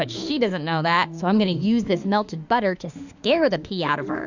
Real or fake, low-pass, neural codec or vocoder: fake; 7.2 kHz; autoencoder, 48 kHz, 32 numbers a frame, DAC-VAE, trained on Japanese speech